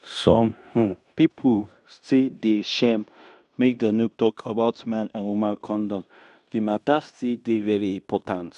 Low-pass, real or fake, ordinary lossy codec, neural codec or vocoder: 10.8 kHz; fake; none; codec, 16 kHz in and 24 kHz out, 0.9 kbps, LongCat-Audio-Codec, fine tuned four codebook decoder